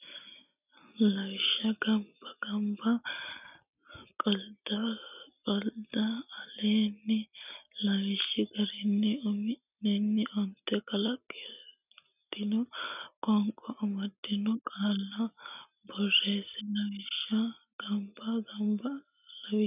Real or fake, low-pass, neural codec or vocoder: real; 3.6 kHz; none